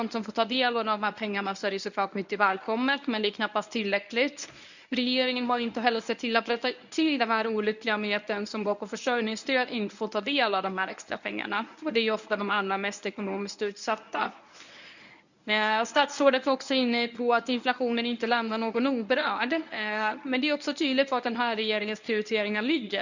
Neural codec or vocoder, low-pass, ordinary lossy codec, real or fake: codec, 24 kHz, 0.9 kbps, WavTokenizer, medium speech release version 1; 7.2 kHz; none; fake